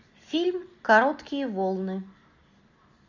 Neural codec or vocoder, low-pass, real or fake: none; 7.2 kHz; real